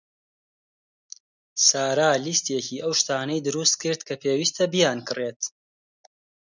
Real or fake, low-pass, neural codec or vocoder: real; 7.2 kHz; none